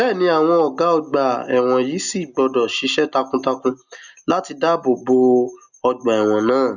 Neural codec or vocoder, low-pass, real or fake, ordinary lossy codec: none; 7.2 kHz; real; none